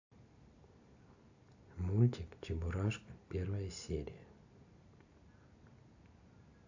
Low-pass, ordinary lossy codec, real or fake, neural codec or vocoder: 7.2 kHz; AAC, 48 kbps; real; none